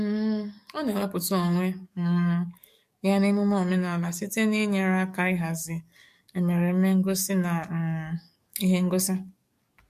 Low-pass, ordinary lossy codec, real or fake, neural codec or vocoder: 14.4 kHz; MP3, 64 kbps; fake; codec, 44.1 kHz, 7.8 kbps, DAC